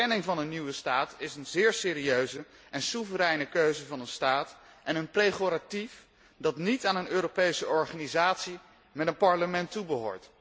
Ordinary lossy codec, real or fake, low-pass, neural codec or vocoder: none; real; none; none